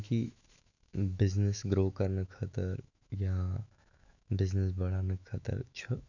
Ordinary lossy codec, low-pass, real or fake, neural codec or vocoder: none; 7.2 kHz; fake; autoencoder, 48 kHz, 128 numbers a frame, DAC-VAE, trained on Japanese speech